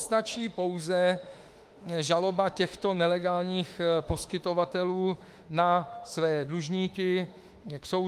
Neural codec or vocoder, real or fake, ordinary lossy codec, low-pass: autoencoder, 48 kHz, 32 numbers a frame, DAC-VAE, trained on Japanese speech; fake; Opus, 32 kbps; 14.4 kHz